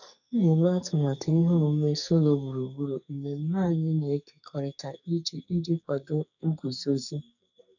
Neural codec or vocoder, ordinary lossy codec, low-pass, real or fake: codec, 44.1 kHz, 2.6 kbps, SNAC; none; 7.2 kHz; fake